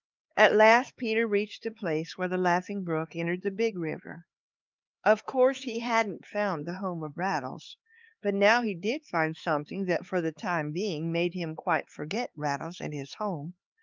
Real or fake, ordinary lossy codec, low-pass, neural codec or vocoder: fake; Opus, 24 kbps; 7.2 kHz; codec, 16 kHz, 4 kbps, X-Codec, HuBERT features, trained on LibriSpeech